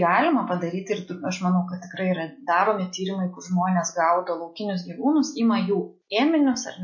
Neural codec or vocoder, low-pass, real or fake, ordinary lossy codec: none; 7.2 kHz; real; MP3, 32 kbps